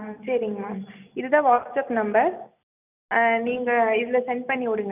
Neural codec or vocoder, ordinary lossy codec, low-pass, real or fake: none; none; 3.6 kHz; real